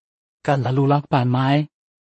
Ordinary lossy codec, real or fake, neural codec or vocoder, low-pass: MP3, 32 kbps; fake; codec, 16 kHz in and 24 kHz out, 0.4 kbps, LongCat-Audio-Codec, two codebook decoder; 10.8 kHz